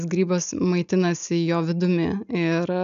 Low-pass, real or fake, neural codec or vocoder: 7.2 kHz; real; none